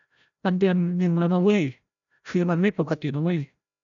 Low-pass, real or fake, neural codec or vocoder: 7.2 kHz; fake; codec, 16 kHz, 0.5 kbps, FreqCodec, larger model